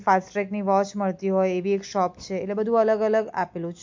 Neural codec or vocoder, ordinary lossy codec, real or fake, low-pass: none; MP3, 48 kbps; real; 7.2 kHz